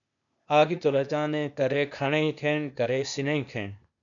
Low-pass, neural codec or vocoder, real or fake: 7.2 kHz; codec, 16 kHz, 0.8 kbps, ZipCodec; fake